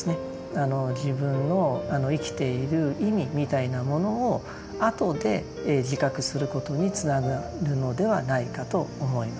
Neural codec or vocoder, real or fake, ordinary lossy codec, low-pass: none; real; none; none